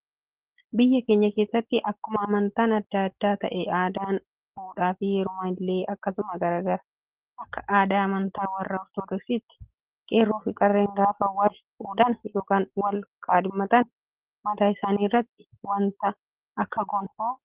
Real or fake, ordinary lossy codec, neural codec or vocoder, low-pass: real; Opus, 16 kbps; none; 3.6 kHz